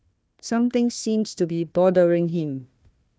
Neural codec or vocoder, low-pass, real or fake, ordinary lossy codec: codec, 16 kHz, 1 kbps, FunCodec, trained on Chinese and English, 50 frames a second; none; fake; none